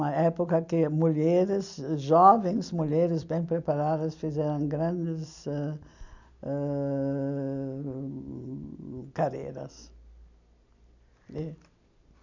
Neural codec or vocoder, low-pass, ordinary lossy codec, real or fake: none; 7.2 kHz; none; real